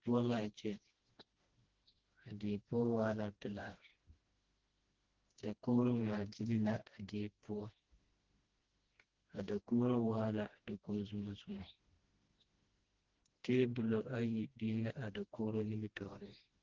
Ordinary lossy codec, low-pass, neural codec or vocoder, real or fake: Opus, 16 kbps; 7.2 kHz; codec, 16 kHz, 1 kbps, FreqCodec, smaller model; fake